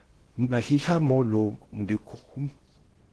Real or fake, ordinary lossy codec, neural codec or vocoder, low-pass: fake; Opus, 16 kbps; codec, 16 kHz in and 24 kHz out, 0.6 kbps, FocalCodec, streaming, 4096 codes; 10.8 kHz